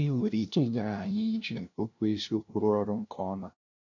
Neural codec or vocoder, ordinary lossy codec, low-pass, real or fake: codec, 16 kHz, 0.5 kbps, FunCodec, trained on LibriTTS, 25 frames a second; none; 7.2 kHz; fake